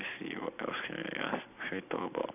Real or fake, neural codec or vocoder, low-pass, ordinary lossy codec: real; none; 3.6 kHz; none